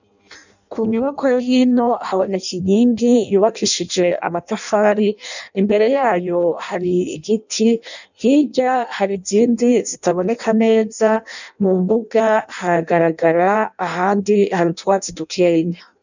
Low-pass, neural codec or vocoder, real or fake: 7.2 kHz; codec, 16 kHz in and 24 kHz out, 0.6 kbps, FireRedTTS-2 codec; fake